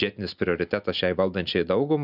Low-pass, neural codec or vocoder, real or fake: 5.4 kHz; none; real